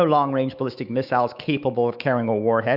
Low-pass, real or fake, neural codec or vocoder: 5.4 kHz; fake; autoencoder, 48 kHz, 128 numbers a frame, DAC-VAE, trained on Japanese speech